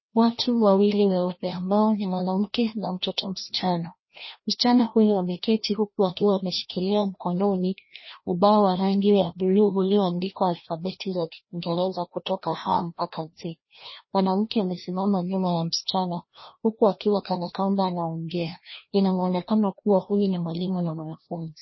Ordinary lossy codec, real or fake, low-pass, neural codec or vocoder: MP3, 24 kbps; fake; 7.2 kHz; codec, 16 kHz, 1 kbps, FreqCodec, larger model